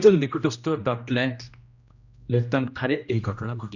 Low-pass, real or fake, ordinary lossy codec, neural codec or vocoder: 7.2 kHz; fake; none; codec, 16 kHz, 1 kbps, X-Codec, HuBERT features, trained on general audio